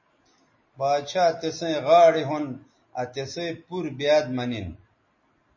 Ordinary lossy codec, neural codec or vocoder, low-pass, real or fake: MP3, 32 kbps; none; 7.2 kHz; real